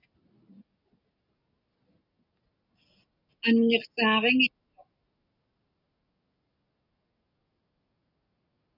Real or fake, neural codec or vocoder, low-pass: real; none; 5.4 kHz